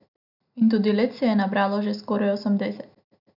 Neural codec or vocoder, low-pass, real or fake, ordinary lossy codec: none; 5.4 kHz; real; none